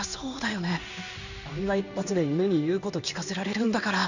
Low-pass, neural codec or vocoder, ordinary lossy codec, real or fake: 7.2 kHz; codec, 16 kHz in and 24 kHz out, 1 kbps, XY-Tokenizer; none; fake